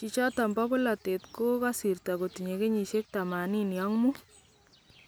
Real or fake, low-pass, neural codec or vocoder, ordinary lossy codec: real; none; none; none